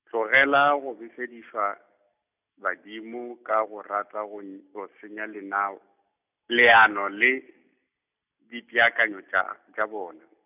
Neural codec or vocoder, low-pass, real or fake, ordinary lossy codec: none; 3.6 kHz; real; none